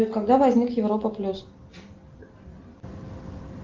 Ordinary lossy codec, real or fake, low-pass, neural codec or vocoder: Opus, 32 kbps; real; 7.2 kHz; none